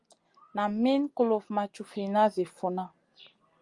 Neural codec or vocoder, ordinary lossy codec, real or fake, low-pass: none; Opus, 32 kbps; real; 10.8 kHz